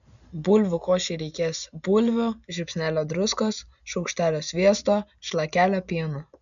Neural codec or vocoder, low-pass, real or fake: none; 7.2 kHz; real